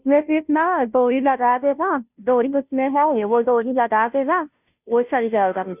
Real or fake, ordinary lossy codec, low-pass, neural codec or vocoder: fake; none; 3.6 kHz; codec, 16 kHz, 0.5 kbps, FunCodec, trained on Chinese and English, 25 frames a second